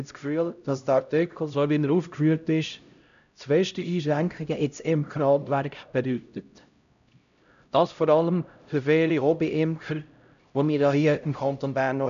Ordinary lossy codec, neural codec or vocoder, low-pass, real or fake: none; codec, 16 kHz, 0.5 kbps, X-Codec, HuBERT features, trained on LibriSpeech; 7.2 kHz; fake